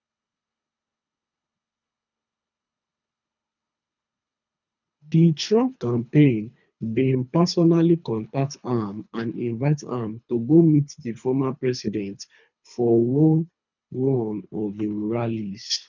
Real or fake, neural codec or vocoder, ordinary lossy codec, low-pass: fake; codec, 24 kHz, 3 kbps, HILCodec; none; 7.2 kHz